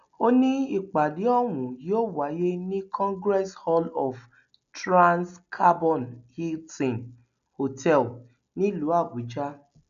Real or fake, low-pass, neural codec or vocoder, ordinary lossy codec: real; 7.2 kHz; none; none